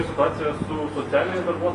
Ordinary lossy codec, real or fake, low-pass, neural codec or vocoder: AAC, 96 kbps; real; 10.8 kHz; none